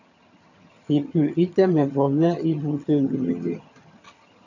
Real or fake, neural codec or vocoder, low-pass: fake; vocoder, 22.05 kHz, 80 mel bands, HiFi-GAN; 7.2 kHz